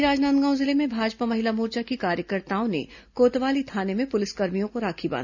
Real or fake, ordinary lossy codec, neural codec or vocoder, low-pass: real; none; none; 7.2 kHz